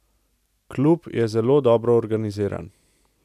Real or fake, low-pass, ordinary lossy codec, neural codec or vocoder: real; 14.4 kHz; none; none